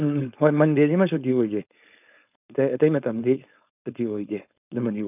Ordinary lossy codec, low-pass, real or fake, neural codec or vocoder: none; 3.6 kHz; fake; codec, 16 kHz, 4.8 kbps, FACodec